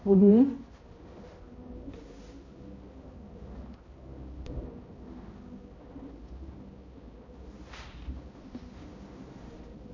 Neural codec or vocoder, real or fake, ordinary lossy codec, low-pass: codec, 16 kHz, 0.5 kbps, X-Codec, HuBERT features, trained on balanced general audio; fake; MP3, 32 kbps; 7.2 kHz